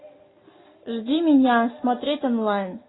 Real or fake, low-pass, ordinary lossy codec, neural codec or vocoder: real; 7.2 kHz; AAC, 16 kbps; none